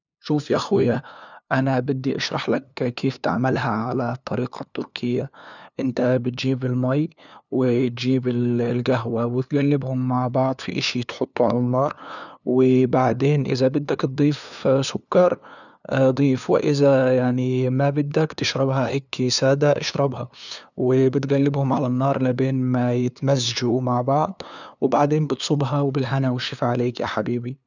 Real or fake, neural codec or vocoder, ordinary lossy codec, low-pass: fake; codec, 16 kHz, 2 kbps, FunCodec, trained on LibriTTS, 25 frames a second; none; 7.2 kHz